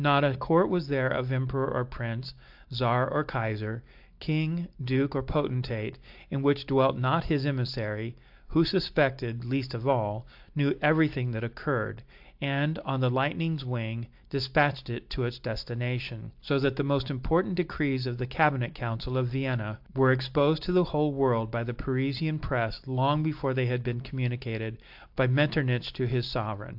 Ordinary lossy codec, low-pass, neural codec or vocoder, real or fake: AAC, 48 kbps; 5.4 kHz; none; real